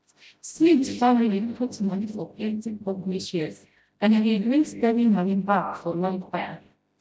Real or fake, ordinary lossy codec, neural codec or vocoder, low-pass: fake; none; codec, 16 kHz, 0.5 kbps, FreqCodec, smaller model; none